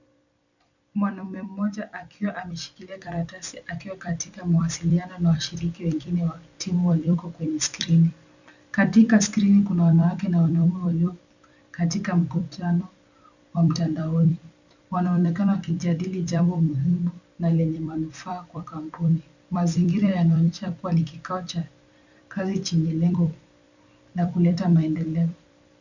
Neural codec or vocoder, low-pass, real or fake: vocoder, 44.1 kHz, 128 mel bands every 256 samples, BigVGAN v2; 7.2 kHz; fake